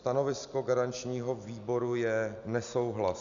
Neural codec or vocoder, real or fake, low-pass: none; real; 7.2 kHz